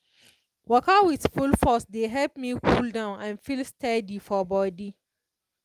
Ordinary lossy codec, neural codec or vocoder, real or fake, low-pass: Opus, 32 kbps; none; real; 14.4 kHz